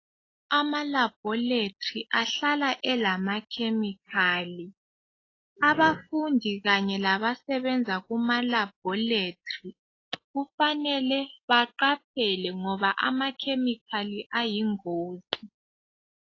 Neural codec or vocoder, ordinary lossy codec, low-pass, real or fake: none; AAC, 32 kbps; 7.2 kHz; real